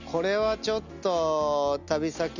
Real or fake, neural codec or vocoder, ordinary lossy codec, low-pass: real; none; none; 7.2 kHz